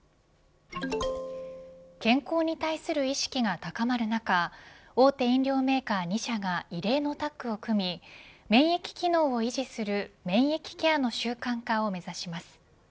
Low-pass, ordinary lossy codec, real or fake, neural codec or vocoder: none; none; real; none